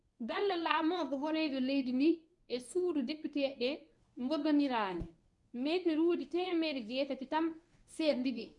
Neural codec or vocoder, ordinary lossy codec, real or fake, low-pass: codec, 24 kHz, 0.9 kbps, WavTokenizer, medium speech release version 1; none; fake; 10.8 kHz